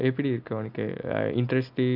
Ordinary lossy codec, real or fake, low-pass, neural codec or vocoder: none; real; 5.4 kHz; none